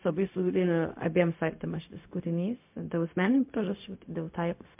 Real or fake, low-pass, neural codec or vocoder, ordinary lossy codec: fake; 3.6 kHz; codec, 16 kHz, 0.4 kbps, LongCat-Audio-Codec; MP3, 32 kbps